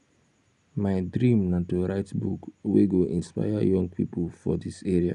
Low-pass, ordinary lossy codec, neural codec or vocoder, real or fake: 10.8 kHz; none; none; real